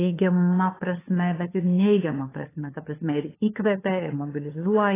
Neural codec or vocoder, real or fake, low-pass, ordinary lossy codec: codec, 16 kHz, 2 kbps, FunCodec, trained on LibriTTS, 25 frames a second; fake; 3.6 kHz; AAC, 16 kbps